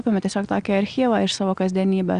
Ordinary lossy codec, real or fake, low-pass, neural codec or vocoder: Opus, 64 kbps; real; 9.9 kHz; none